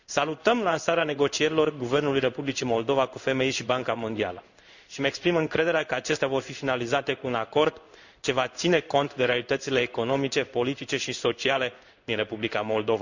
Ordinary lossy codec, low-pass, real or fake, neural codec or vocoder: none; 7.2 kHz; fake; codec, 16 kHz in and 24 kHz out, 1 kbps, XY-Tokenizer